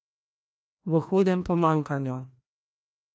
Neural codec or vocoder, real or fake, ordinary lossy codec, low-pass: codec, 16 kHz, 1 kbps, FreqCodec, larger model; fake; none; none